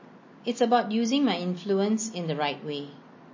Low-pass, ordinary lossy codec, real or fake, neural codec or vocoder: 7.2 kHz; MP3, 32 kbps; real; none